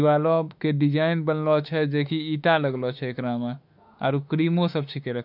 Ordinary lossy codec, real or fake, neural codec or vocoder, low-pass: none; fake; autoencoder, 48 kHz, 32 numbers a frame, DAC-VAE, trained on Japanese speech; 5.4 kHz